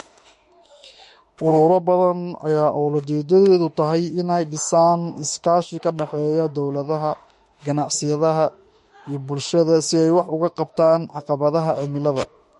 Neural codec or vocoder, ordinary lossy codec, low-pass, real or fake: autoencoder, 48 kHz, 32 numbers a frame, DAC-VAE, trained on Japanese speech; MP3, 48 kbps; 14.4 kHz; fake